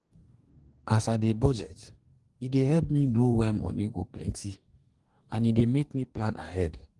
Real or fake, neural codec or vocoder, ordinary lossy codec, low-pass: fake; codec, 44.1 kHz, 2.6 kbps, DAC; Opus, 24 kbps; 10.8 kHz